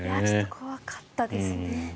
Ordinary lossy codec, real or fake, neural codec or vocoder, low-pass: none; real; none; none